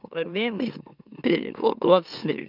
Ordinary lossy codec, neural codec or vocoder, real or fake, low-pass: none; autoencoder, 44.1 kHz, a latent of 192 numbers a frame, MeloTTS; fake; 5.4 kHz